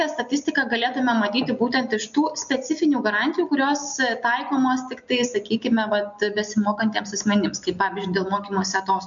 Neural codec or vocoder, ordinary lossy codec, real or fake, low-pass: none; MP3, 64 kbps; real; 7.2 kHz